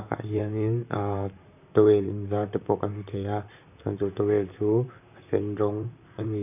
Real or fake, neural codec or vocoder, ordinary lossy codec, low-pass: fake; vocoder, 44.1 kHz, 128 mel bands, Pupu-Vocoder; none; 3.6 kHz